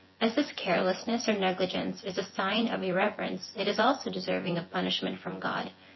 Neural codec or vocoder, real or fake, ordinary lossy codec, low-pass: vocoder, 24 kHz, 100 mel bands, Vocos; fake; MP3, 24 kbps; 7.2 kHz